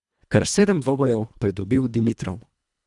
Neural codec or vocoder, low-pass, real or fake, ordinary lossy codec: codec, 24 kHz, 1.5 kbps, HILCodec; 10.8 kHz; fake; none